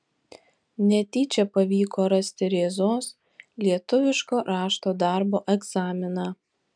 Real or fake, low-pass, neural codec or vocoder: real; 9.9 kHz; none